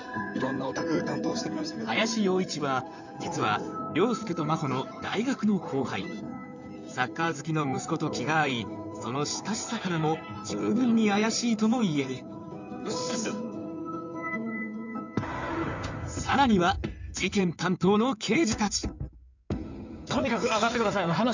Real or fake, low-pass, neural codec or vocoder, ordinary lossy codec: fake; 7.2 kHz; codec, 16 kHz in and 24 kHz out, 2.2 kbps, FireRedTTS-2 codec; none